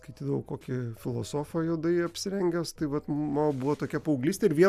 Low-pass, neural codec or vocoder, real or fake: 14.4 kHz; none; real